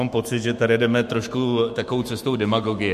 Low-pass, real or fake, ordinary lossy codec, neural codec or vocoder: 14.4 kHz; fake; MP3, 64 kbps; autoencoder, 48 kHz, 128 numbers a frame, DAC-VAE, trained on Japanese speech